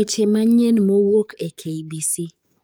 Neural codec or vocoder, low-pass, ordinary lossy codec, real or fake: codec, 44.1 kHz, 7.8 kbps, DAC; none; none; fake